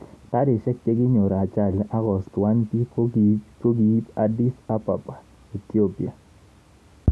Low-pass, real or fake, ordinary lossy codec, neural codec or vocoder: none; real; none; none